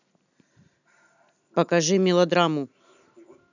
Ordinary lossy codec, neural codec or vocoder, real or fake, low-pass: none; none; real; 7.2 kHz